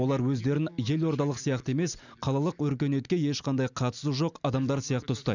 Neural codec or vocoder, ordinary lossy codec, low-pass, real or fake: none; none; 7.2 kHz; real